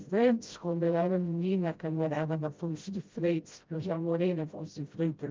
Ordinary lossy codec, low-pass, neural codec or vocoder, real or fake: Opus, 32 kbps; 7.2 kHz; codec, 16 kHz, 0.5 kbps, FreqCodec, smaller model; fake